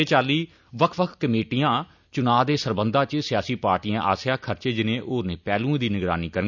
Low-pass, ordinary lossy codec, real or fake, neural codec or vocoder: 7.2 kHz; none; real; none